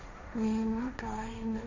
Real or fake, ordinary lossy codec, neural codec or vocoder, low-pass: fake; none; codec, 16 kHz, 1.1 kbps, Voila-Tokenizer; 7.2 kHz